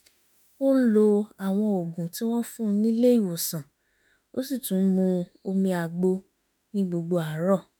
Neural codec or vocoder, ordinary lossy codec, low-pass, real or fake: autoencoder, 48 kHz, 32 numbers a frame, DAC-VAE, trained on Japanese speech; none; none; fake